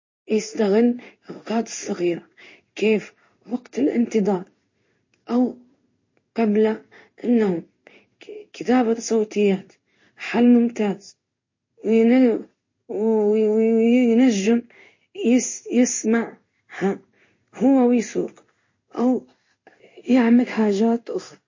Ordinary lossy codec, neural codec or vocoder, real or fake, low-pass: MP3, 32 kbps; codec, 16 kHz in and 24 kHz out, 1 kbps, XY-Tokenizer; fake; 7.2 kHz